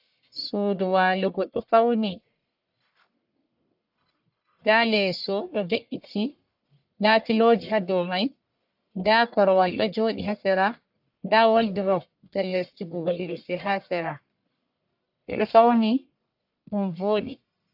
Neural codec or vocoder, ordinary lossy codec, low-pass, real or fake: codec, 44.1 kHz, 1.7 kbps, Pupu-Codec; AAC, 48 kbps; 5.4 kHz; fake